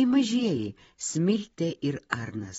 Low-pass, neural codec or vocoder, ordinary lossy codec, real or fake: 19.8 kHz; vocoder, 44.1 kHz, 128 mel bands every 512 samples, BigVGAN v2; AAC, 24 kbps; fake